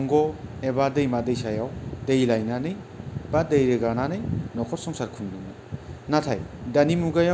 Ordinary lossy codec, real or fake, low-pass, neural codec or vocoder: none; real; none; none